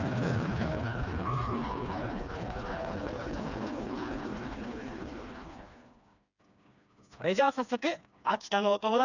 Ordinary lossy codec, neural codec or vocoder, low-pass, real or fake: none; codec, 16 kHz, 2 kbps, FreqCodec, smaller model; 7.2 kHz; fake